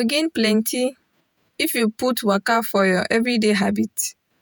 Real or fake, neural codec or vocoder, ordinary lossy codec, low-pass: fake; vocoder, 48 kHz, 128 mel bands, Vocos; none; none